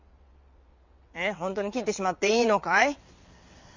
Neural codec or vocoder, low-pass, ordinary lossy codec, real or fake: vocoder, 22.05 kHz, 80 mel bands, WaveNeXt; 7.2 kHz; MP3, 64 kbps; fake